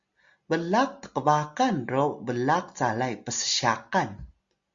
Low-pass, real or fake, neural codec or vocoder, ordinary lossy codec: 7.2 kHz; real; none; Opus, 64 kbps